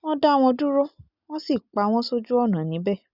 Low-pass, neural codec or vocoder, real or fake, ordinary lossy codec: 5.4 kHz; none; real; none